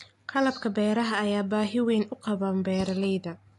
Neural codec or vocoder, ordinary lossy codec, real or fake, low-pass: none; AAC, 48 kbps; real; 10.8 kHz